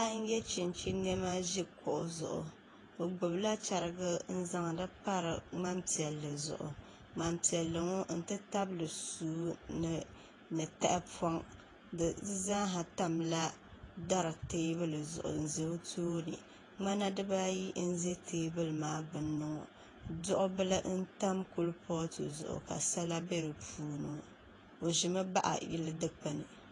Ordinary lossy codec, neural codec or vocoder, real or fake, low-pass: AAC, 32 kbps; vocoder, 48 kHz, 128 mel bands, Vocos; fake; 10.8 kHz